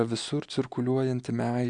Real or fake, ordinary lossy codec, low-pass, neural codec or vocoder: real; Opus, 64 kbps; 9.9 kHz; none